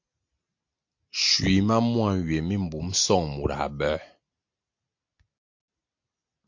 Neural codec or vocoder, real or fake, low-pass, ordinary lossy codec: none; real; 7.2 kHz; MP3, 48 kbps